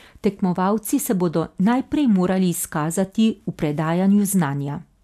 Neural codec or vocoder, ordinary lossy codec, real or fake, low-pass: none; none; real; 14.4 kHz